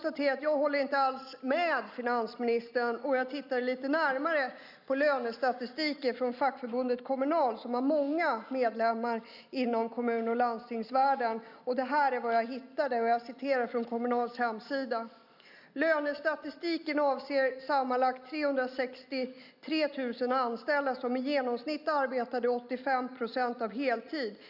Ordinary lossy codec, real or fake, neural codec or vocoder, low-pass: none; real; none; 5.4 kHz